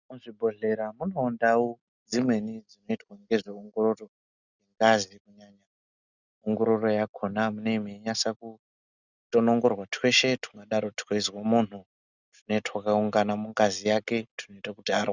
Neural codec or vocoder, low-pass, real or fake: none; 7.2 kHz; real